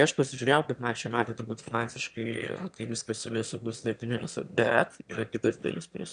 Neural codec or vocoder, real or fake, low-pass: autoencoder, 22.05 kHz, a latent of 192 numbers a frame, VITS, trained on one speaker; fake; 9.9 kHz